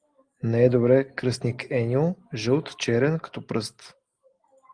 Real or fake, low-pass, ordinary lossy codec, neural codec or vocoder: real; 9.9 kHz; Opus, 24 kbps; none